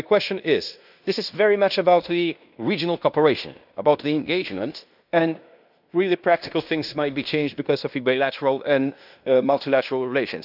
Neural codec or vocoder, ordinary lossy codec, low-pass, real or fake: codec, 16 kHz in and 24 kHz out, 0.9 kbps, LongCat-Audio-Codec, fine tuned four codebook decoder; none; 5.4 kHz; fake